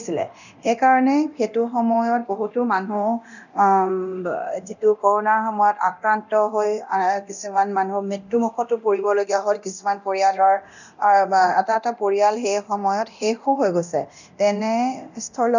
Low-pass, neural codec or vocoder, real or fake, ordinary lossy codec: 7.2 kHz; codec, 24 kHz, 0.9 kbps, DualCodec; fake; none